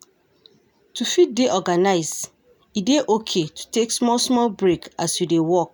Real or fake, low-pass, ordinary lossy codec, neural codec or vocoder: real; none; none; none